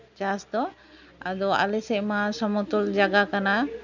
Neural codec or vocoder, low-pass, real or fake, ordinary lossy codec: none; 7.2 kHz; real; none